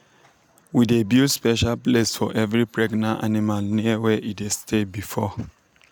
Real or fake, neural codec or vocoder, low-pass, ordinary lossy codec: real; none; none; none